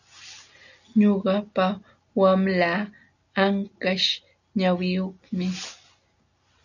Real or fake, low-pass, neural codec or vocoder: real; 7.2 kHz; none